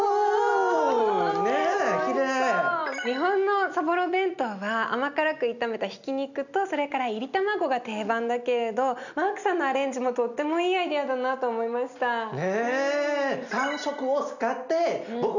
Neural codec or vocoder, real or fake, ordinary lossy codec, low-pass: none; real; none; 7.2 kHz